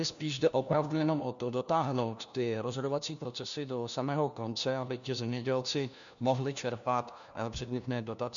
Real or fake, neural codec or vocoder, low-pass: fake; codec, 16 kHz, 1 kbps, FunCodec, trained on LibriTTS, 50 frames a second; 7.2 kHz